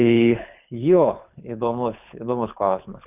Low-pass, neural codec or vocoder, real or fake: 3.6 kHz; codec, 24 kHz, 6 kbps, HILCodec; fake